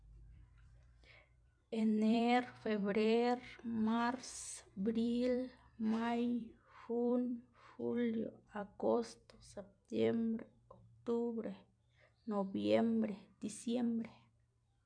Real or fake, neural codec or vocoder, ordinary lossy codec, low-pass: fake; vocoder, 44.1 kHz, 128 mel bands every 256 samples, BigVGAN v2; none; 9.9 kHz